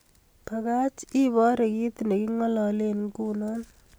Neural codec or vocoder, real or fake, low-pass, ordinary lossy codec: none; real; none; none